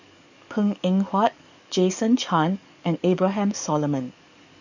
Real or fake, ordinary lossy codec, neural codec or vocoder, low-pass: fake; none; codec, 44.1 kHz, 7.8 kbps, DAC; 7.2 kHz